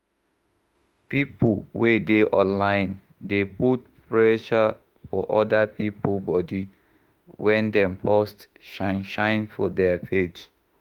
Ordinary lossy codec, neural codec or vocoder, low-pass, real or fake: Opus, 32 kbps; autoencoder, 48 kHz, 32 numbers a frame, DAC-VAE, trained on Japanese speech; 19.8 kHz; fake